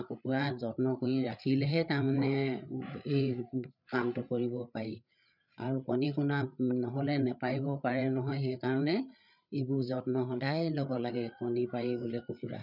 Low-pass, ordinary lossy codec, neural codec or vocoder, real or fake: 5.4 kHz; none; codec, 16 kHz, 8 kbps, FreqCodec, larger model; fake